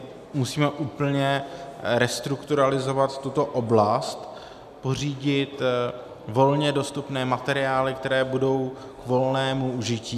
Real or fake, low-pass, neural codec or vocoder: real; 14.4 kHz; none